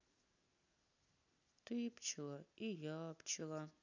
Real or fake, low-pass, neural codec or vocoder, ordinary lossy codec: real; 7.2 kHz; none; none